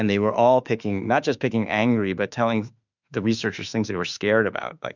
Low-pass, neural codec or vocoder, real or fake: 7.2 kHz; autoencoder, 48 kHz, 32 numbers a frame, DAC-VAE, trained on Japanese speech; fake